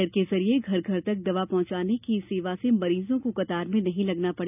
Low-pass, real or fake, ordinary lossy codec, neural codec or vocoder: 3.6 kHz; real; none; none